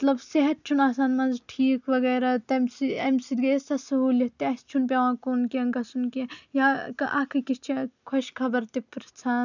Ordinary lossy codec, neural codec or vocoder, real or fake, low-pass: none; none; real; 7.2 kHz